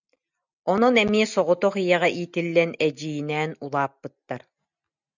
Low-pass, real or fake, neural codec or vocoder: 7.2 kHz; real; none